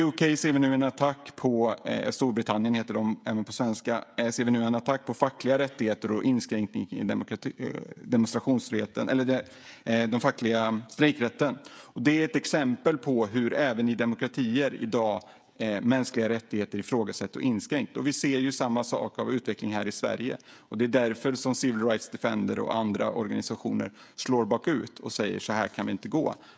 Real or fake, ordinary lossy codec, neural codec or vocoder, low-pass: fake; none; codec, 16 kHz, 16 kbps, FreqCodec, smaller model; none